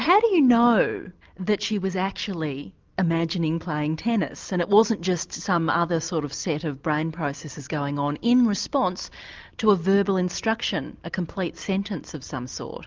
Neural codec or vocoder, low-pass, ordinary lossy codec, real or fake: none; 7.2 kHz; Opus, 32 kbps; real